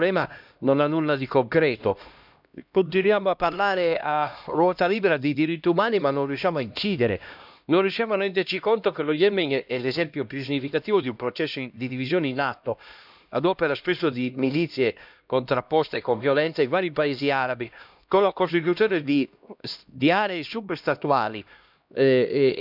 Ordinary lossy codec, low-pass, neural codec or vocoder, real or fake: none; 5.4 kHz; codec, 16 kHz, 1 kbps, X-Codec, HuBERT features, trained on LibriSpeech; fake